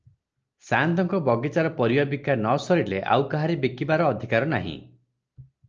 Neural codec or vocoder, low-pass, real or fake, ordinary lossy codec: none; 7.2 kHz; real; Opus, 24 kbps